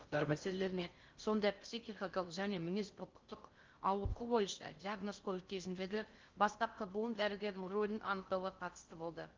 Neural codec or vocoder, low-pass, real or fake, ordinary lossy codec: codec, 16 kHz in and 24 kHz out, 0.6 kbps, FocalCodec, streaming, 4096 codes; 7.2 kHz; fake; Opus, 32 kbps